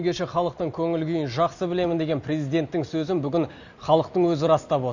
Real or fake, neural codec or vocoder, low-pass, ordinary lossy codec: real; none; 7.2 kHz; none